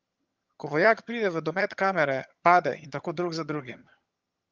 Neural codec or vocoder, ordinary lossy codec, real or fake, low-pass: vocoder, 22.05 kHz, 80 mel bands, HiFi-GAN; Opus, 24 kbps; fake; 7.2 kHz